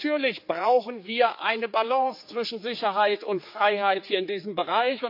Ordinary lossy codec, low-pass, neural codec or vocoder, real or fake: MP3, 32 kbps; 5.4 kHz; codec, 16 kHz, 4 kbps, X-Codec, HuBERT features, trained on general audio; fake